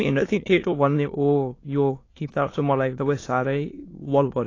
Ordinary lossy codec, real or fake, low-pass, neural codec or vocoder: AAC, 32 kbps; fake; 7.2 kHz; autoencoder, 22.05 kHz, a latent of 192 numbers a frame, VITS, trained on many speakers